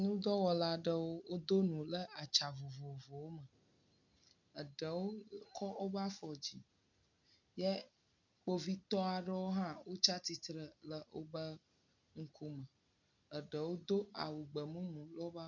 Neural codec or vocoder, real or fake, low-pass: none; real; 7.2 kHz